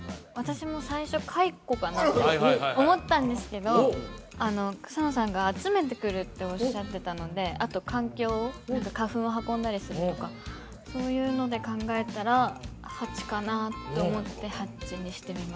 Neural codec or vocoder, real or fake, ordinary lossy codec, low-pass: none; real; none; none